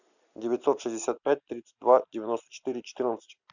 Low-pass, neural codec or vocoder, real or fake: 7.2 kHz; none; real